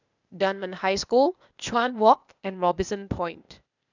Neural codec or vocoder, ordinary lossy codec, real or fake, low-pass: codec, 16 kHz, 0.8 kbps, ZipCodec; none; fake; 7.2 kHz